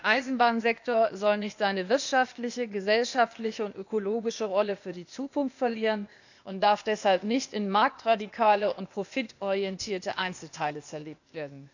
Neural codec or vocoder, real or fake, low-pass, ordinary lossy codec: codec, 16 kHz, 0.8 kbps, ZipCodec; fake; 7.2 kHz; none